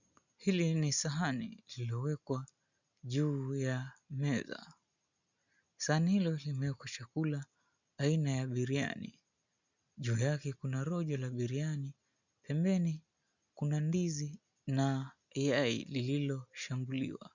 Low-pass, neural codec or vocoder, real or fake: 7.2 kHz; none; real